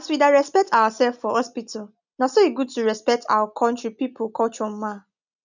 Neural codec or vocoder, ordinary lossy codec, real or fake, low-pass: none; none; real; 7.2 kHz